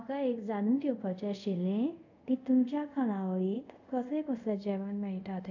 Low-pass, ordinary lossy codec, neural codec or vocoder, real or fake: 7.2 kHz; none; codec, 24 kHz, 0.5 kbps, DualCodec; fake